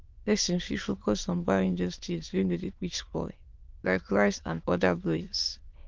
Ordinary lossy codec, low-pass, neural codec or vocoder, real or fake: Opus, 24 kbps; 7.2 kHz; autoencoder, 22.05 kHz, a latent of 192 numbers a frame, VITS, trained on many speakers; fake